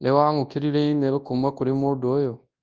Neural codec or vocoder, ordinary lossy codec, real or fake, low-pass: codec, 24 kHz, 0.9 kbps, WavTokenizer, large speech release; Opus, 24 kbps; fake; 7.2 kHz